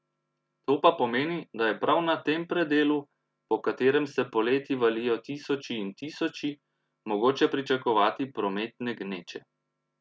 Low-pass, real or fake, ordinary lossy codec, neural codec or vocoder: none; real; none; none